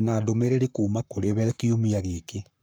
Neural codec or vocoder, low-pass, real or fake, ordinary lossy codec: codec, 44.1 kHz, 7.8 kbps, Pupu-Codec; none; fake; none